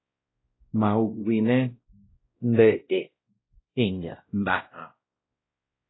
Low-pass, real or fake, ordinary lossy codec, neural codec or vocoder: 7.2 kHz; fake; AAC, 16 kbps; codec, 16 kHz, 0.5 kbps, X-Codec, WavLM features, trained on Multilingual LibriSpeech